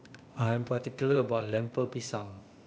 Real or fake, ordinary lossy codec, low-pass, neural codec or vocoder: fake; none; none; codec, 16 kHz, 0.8 kbps, ZipCodec